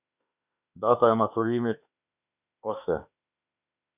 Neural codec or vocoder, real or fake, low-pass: autoencoder, 48 kHz, 32 numbers a frame, DAC-VAE, trained on Japanese speech; fake; 3.6 kHz